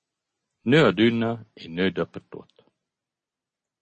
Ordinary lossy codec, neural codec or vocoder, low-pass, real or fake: MP3, 32 kbps; none; 10.8 kHz; real